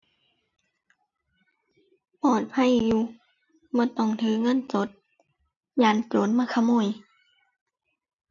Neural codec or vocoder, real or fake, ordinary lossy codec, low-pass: none; real; none; 7.2 kHz